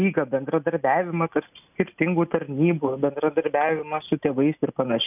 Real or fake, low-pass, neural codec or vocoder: real; 3.6 kHz; none